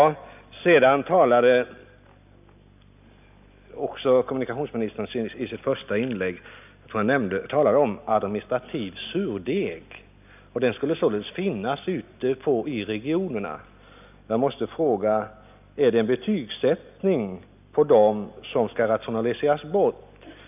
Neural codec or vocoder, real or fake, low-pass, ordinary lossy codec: none; real; 3.6 kHz; none